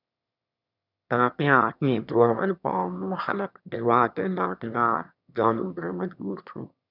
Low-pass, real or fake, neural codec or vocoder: 5.4 kHz; fake; autoencoder, 22.05 kHz, a latent of 192 numbers a frame, VITS, trained on one speaker